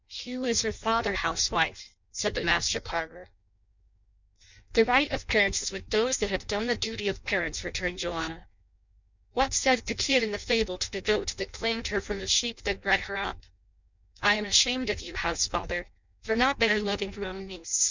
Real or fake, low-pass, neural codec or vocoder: fake; 7.2 kHz; codec, 16 kHz in and 24 kHz out, 0.6 kbps, FireRedTTS-2 codec